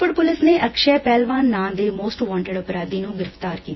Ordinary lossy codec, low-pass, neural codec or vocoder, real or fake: MP3, 24 kbps; 7.2 kHz; vocoder, 24 kHz, 100 mel bands, Vocos; fake